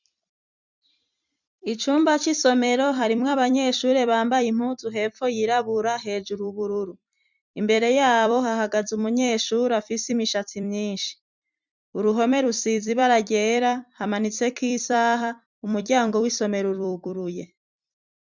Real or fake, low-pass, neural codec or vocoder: fake; 7.2 kHz; vocoder, 44.1 kHz, 128 mel bands every 256 samples, BigVGAN v2